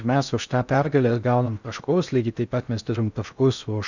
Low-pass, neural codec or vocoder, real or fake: 7.2 kHz; codec, 16 kHz in and 24 kHz out, 0.6 kbps, FocalCodec, streaming, 4096 codes; fake